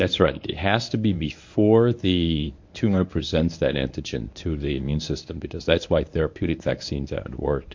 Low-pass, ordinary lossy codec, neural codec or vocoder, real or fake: 7.2 kHz; MP3, 48 kbps; codec, 24 kHz, 0.9 kbps, WavTokenizer, medium speech release version 1; fake